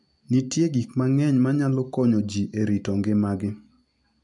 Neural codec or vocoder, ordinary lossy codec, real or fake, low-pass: none; none; real; 10.8 kHz